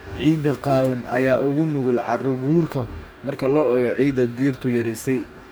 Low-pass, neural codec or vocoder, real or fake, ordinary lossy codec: none; codec, 44.1 kHz, 2.6 kbps, DAC; fake; none